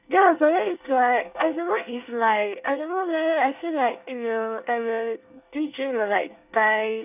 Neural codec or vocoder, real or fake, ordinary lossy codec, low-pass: codec, 24 kHz, 1 kbps, SNAC; fake; none; 3.6 kHz